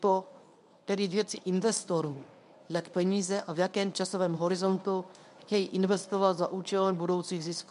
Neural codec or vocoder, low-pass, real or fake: codec, 24 kHz, 0.9 kbps, WavTokenizer, medium speech release version 1; 10.8 kHz; fake